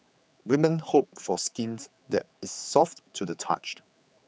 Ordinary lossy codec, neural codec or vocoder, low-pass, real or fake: none; codec, 16 kHz, 4 kbps, X-Codec, HuBERT features, trained on general audio; none; fake